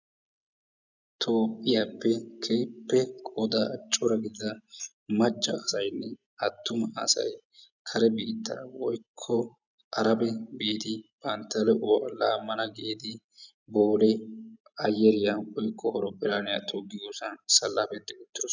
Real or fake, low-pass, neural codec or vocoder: real; 7.2 kHz; none